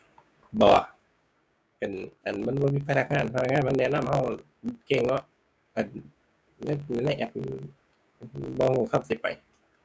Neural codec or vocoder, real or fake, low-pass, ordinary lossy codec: codec, 16 kHz, 6 kbps, DAC; fake; none; none